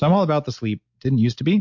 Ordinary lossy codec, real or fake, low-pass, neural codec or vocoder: MP3, 48 kbps; real; 7.2 kHz; none